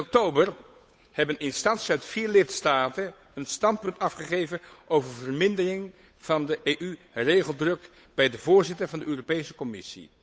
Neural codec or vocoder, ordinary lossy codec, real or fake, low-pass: codec, 16 kHz, 8 kbps, FunCodec, trained on Chinese and English, 25 frames a second; none; fake; none